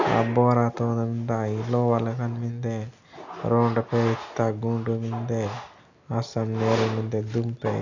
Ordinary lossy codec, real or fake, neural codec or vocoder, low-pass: none; real; none; 7.2 kHz